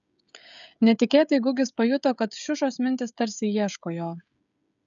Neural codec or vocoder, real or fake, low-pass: codec, 16 kHz, 16 kbps, FreqCodec, smaller model; fake; 7.2 kHz